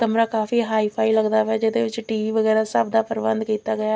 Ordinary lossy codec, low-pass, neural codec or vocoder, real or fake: none; none; none; real